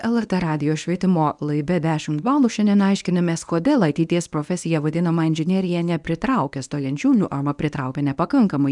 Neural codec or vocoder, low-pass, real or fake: codec, 24 kHz, 0.9 kbps, WavTokenizer, medium speech release version 1; 10.8 kHz; fake